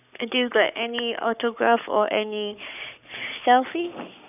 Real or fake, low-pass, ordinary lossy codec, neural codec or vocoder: fake; 3.6 kHz; none; codec, 44.1 kHz, 7.8 kbps, Pupu-Codec